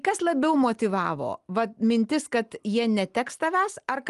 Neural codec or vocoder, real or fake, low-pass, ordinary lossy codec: none; real; 10.8 kHz; Opus, 32 kbps